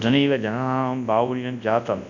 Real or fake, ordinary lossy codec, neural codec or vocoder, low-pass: fake; none; codec, 24 kHz, 0.9 kbps, WavTokenizer, large speech release; 7.2 kHz